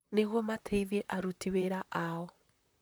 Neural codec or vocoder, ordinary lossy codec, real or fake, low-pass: vocoder, 44.1 kHz, 128 mel bands, Pupu-Vocoder; none; fake; none